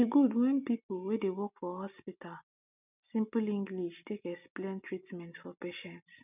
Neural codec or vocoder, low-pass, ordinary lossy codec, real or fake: none; 3.6 kHz; none; real